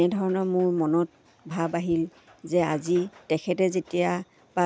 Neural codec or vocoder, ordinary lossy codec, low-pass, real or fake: none; none; none; real